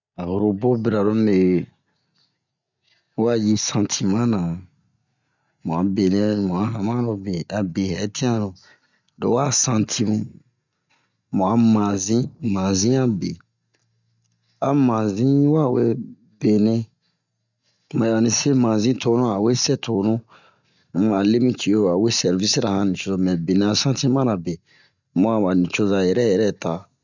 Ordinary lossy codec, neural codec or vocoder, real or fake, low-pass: none; none; real; 7.2 kHz